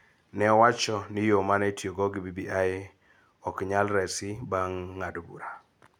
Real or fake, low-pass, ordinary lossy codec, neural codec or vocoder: real; 19.8 kHz; none; none